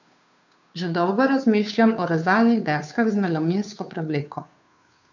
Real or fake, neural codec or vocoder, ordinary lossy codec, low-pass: fake; codec, 16 kHz, 2 kbps, FunCodec, trained on Chinese and English, 25 frames a second; none; 7.2 kHz